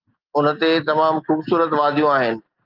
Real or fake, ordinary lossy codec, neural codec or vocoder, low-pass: real; Opus, 24 kbps; none; 5.4 kHz